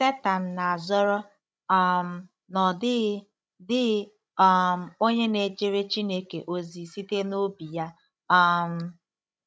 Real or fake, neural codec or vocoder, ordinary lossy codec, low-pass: fake; codec, 16 kHz, 16 kbps, FreqCodec, larger model; none; none